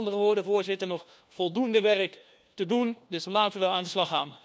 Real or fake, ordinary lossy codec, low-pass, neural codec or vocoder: fake; none; none; codec, 16 kHz, 1 kbps, FunCodec, trained on LibriTTS, 50 frames a second